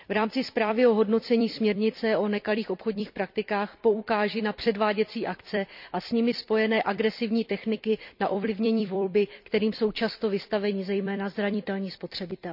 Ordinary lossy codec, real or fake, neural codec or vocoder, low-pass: none; fake; vocoder, 44.1 kHz, 128 mel bands every 256 samples, BigVGAN v2; 5.4 kHz